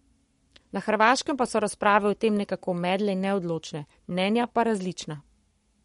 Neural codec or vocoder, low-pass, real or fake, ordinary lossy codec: codec, 44.1 kHz, 7.8 kbps, Pupu-Codec; 19.8 kHz; fake; MP3, 48 kbps